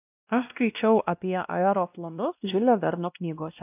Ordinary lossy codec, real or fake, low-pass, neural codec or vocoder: AAC, 32 kbps; fake; 3.6 kHz; codec, 16 kHz, 1 kbps, X-Codec, WavLM features, trained on Multilingual LibriSpeech